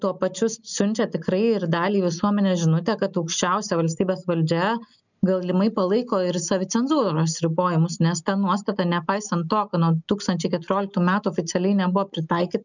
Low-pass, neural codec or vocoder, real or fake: 7.2 kHz; none; real